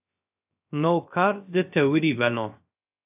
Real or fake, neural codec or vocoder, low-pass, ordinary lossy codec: fake; codec, 16 kHz, 0.3 kbps, FocalCodec; 3.6 kHz; AAC, 32 kbps